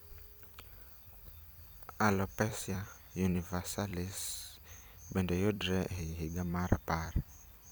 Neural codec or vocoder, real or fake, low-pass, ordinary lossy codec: vocoder, 44.1 kHz, 128 mel bands every 256 samples, BigVGAN v2; fake; none; none